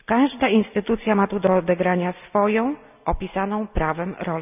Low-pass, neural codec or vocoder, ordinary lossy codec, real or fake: 3.6 kHz; none; none; real